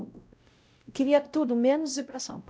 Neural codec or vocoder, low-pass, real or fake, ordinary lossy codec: codec, 16 kHz, 0.5 kbps, X-Codec, WavLM features, trained on Multilingual LibriSpeech; none; fake; none